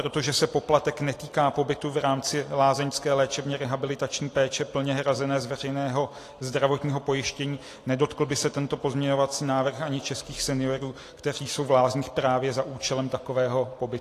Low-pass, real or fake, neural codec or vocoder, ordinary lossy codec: 14.4 kHz; real; none; AAC, 48 kbps